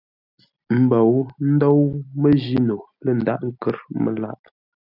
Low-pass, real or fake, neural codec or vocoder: 5.4 kHz; real; none